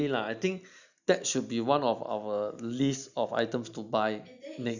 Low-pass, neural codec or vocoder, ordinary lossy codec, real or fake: 7.2 kHz; none; none; real